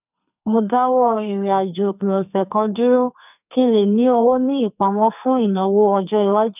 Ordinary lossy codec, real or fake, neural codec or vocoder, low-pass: none; fake; codec, 44.1 kHz, 2.6 kbps, SNAC; 3.6 kHz